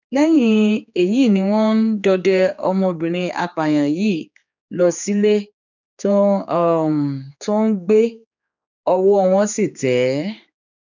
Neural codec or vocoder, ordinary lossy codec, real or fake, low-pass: codec, 16 kHz, 4 kbps, X-Codec, HuBERT features, trained on general audio; none; fake; 7.2 kHz